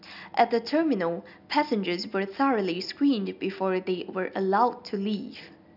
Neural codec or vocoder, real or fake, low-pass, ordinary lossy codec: none; real; 5.4 kHz; none